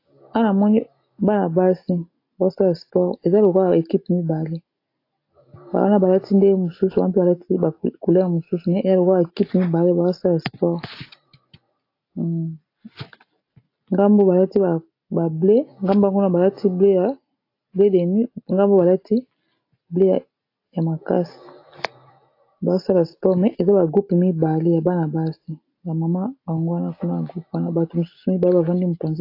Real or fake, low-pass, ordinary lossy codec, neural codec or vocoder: real; 5.4 kHz; AAC, 32 kbps; none